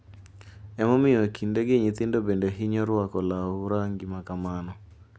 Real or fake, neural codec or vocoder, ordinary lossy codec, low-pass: real; none; none; none